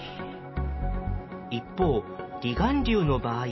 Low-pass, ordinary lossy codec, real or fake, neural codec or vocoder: 7.2 kHz; MP3, 24 kbps; real; none